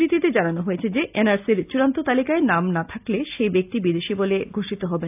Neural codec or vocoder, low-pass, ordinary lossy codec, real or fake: none; 3.6 kHz; AAC, 32 kbps; real